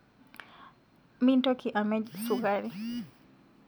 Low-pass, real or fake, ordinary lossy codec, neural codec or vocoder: none; real; none; none